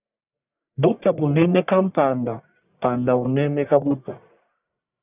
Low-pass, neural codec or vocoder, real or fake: 3.6 kHz; codec, 44.1 kHz, 1.7 kbps, Pupu-Codec; fake